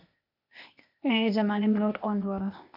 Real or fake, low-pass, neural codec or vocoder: fake; 5.4 kHz; codec, 16 kHz, 0.8 kbps, ZipCodec